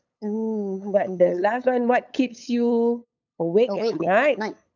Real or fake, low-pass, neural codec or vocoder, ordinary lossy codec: fake; 7.2 kHz; codec, 16 kHz, 8 kbps, FunCodec, trained on LibriTTS, 25 frames a second; none